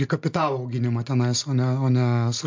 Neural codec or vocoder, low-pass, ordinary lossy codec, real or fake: none; 7.2 kHz; MP3, 64 kbps; real